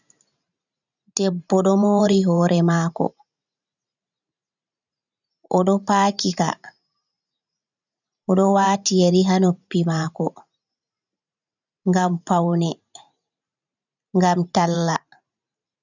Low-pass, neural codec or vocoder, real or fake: 7.2 kHz; vocoder, 44.1 kHz, 128 mel bands every 512 samples, BigVGAN v2; fake